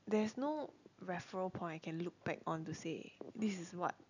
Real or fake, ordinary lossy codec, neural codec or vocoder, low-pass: real; none; none; 7.2 kHz